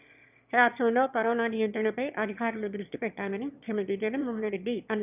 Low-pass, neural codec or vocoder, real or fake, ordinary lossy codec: 3.6 kHz; autoencoder, 22.05 kHz, a latent of 192 numbers a frame, VITS, trained on one speaker; fake; none